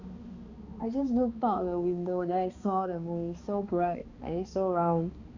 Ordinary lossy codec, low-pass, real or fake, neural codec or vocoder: Opus, 64 kbps; 7.2 kHz; fake; codec, 16 kHz, 2 kbps, X-Codec, HuBERT features, trained on balanced general audio